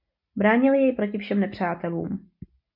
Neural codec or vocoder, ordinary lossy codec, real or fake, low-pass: none; MP3, 48 kbps; real; 5.4 kHz